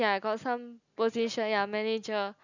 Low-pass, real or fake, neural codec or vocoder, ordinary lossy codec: 7.2 kHz; real; none; none